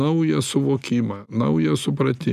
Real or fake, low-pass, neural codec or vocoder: real; 14.4 kHz; none